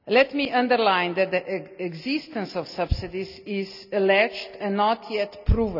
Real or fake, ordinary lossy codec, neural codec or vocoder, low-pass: real; none; none; 5.4 kHz